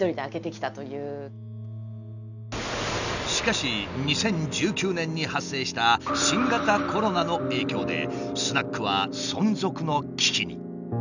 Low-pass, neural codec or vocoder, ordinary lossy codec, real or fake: 7.2 kHz; none; none; real